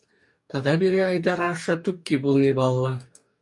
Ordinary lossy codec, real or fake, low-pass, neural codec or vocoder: MP3, 64 kbps; fake; 10.8 kHz; codec, 44.1 kHz, 2.6 kbps, DAC